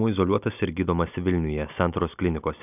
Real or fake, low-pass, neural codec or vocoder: real; 3.6 kHz; none